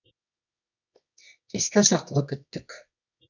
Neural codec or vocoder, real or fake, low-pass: codec, 24 kHz, 0.9 kbps, WavTokenizer, medium music audio release; fake; 7.2 kHz